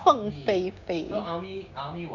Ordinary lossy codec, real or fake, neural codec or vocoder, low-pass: none; fake; codec, 16 kHz, 6 kbps, DAC; 7.2 kHz